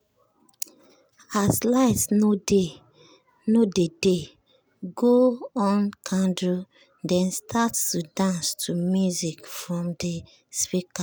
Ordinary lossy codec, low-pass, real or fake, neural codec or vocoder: none; none; real; none